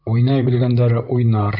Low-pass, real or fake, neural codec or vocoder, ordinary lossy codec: 5.4 kHz; fake; vocoder, 44.1 kHz, 128 mel bands, Pupu-Vocoder; AAC, 48 kbps